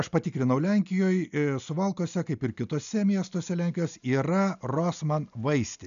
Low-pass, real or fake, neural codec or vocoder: 7.2 kHz; real; none